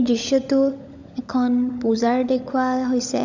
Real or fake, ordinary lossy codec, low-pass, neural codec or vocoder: fake; none; 7.2 kHz; codec, 16 kHz, 16 kbps, FunCodec, trained on LibriTTS, 50 frames a second